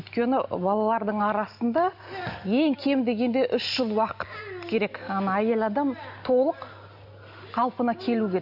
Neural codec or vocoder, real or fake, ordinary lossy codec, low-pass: none; real; none; 5.4 kHz